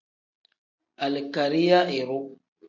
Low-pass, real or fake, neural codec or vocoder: 7.2 kHz; real; none